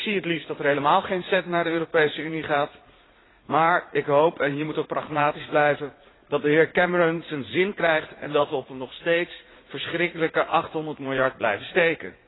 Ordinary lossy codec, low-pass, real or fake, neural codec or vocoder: AAC, 16 kbps; 7.2 kHz; fake; vocoder, 44.1 kHz, 80 mel bands, Vocos